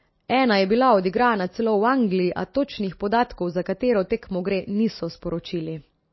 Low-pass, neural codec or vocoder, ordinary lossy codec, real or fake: 7.2 kHz; none; MP3, 24 kbps; real